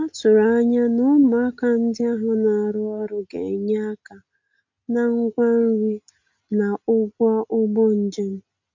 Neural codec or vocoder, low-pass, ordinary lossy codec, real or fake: none; 7.2 kHz; MP3, 64 kbps; real